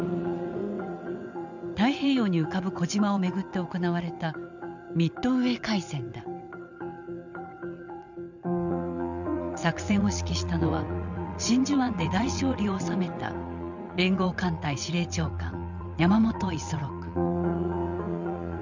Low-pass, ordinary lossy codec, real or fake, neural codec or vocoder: 7.2 kHz; none; fake; vocoder, 22.05 kHz, 80 mel bands, WaveNeXt